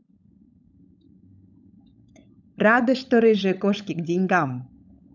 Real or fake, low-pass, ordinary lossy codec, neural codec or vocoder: fake; 7.2 kHz; none; codec, 16 kHz, 16 kbps, FunCodec, trained on LibriTTS, 50 frames a second